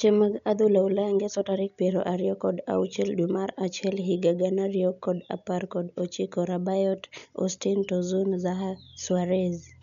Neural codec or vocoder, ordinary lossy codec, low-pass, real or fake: none; none; 7.2 kHz; real